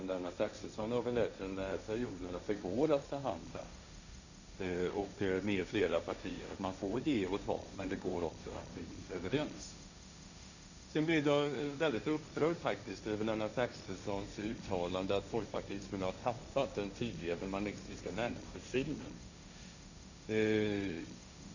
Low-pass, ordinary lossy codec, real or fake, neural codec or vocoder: 7.2 kHz; none; fake; codec, 16 kHz, 1.1 kbps, Voila-Tokenizer